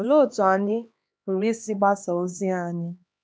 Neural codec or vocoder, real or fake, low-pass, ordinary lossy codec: codec, 16 kHz, 2 kbps, X-Codec, HuBERT features, trained on LibriSpeech; fake; none; none